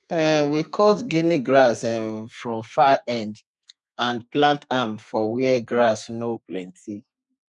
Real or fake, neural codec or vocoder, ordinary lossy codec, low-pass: fake; codec, 32 kHz, 1.9 kbps, SNAC; none; 10.8 kHz